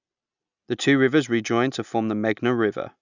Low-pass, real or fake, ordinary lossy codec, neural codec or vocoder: 7.2 kHz; real; none; none